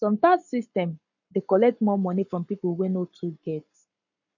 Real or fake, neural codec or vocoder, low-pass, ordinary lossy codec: fake; codec, 44.1 kHz, 7.8 kbps, Pupu-Codec; 7.2 kHz; none